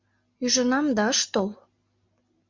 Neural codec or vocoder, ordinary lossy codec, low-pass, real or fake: none; MP3, 48 kbps; 7.2 kHz; real